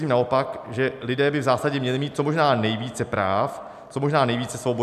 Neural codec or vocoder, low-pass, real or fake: none; 14.4 kHz; real